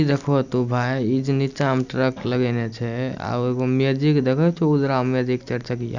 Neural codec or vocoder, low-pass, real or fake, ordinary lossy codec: none; 7.2 kHz; real; none